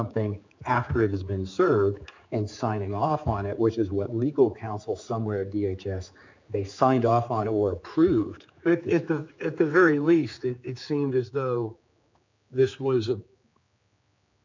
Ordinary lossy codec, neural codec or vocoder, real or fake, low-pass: MP3, 64 kbps; codec, 16 kHz, 4 kbps, X-Codec, HuBERT features, trained on general audio; fake; 7.2 kHz